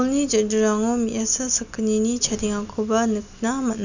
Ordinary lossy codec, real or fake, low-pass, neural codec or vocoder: none; real; 7.2 kHz; none